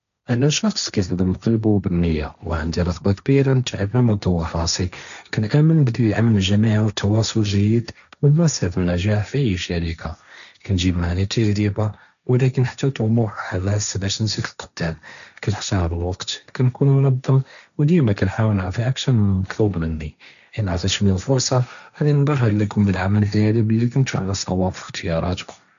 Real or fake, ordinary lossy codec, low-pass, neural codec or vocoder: fake; none; 7.2 kHz; codec, 16 kHz, 1.1 kbps, Voila-Tokenizer